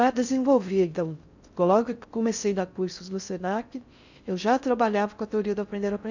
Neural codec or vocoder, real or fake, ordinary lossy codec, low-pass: codec, 16 kHz in and 24 kHz out, 0.6 kbps, FocalCodec, streaming, 4096 codes; fake; none; 7.2 kHz